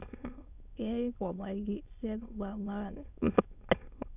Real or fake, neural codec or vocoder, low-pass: fake; autoencoder, 22.05 kHz, a latent of 192 numbers a frame, VITS, trained on many speakers; 3.6 kHz